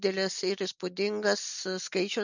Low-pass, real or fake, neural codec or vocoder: 7.2 kHz; real; none